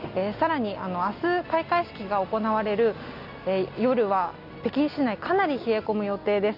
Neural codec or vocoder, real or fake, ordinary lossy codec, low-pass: none; real; none; 5.4 kHz